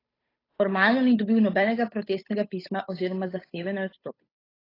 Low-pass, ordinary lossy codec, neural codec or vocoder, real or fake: 5.4 kHz; AAC, 24 kbps; codec, 16 kHz, 8 kbps, FunCodec, trained on Chinese and English, 25 frames a second; fake